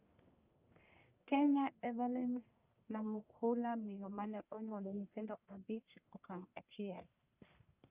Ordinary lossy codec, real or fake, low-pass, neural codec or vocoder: Opus, 64 kbps; fake; 3.6 kHz; codec, 44.1 kHz, 1.7 kbps, Pupu-Codec